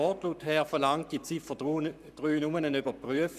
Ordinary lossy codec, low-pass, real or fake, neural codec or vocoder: none; 14.4 kHz; fake; codec, 44.1 kHz, 7.8 kbps, Pupu-Codec